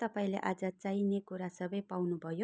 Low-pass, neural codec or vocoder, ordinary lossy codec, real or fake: none; none; none; real